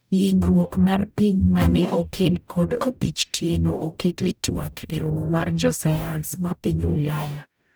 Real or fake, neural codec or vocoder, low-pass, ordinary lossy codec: fake; codec, 44.1 kHz, 0.9 kbps, DAC; none; none